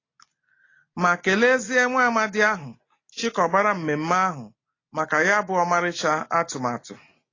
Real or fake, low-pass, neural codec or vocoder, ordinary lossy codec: real; 7.2 kHz; none; AAC, 32 kbps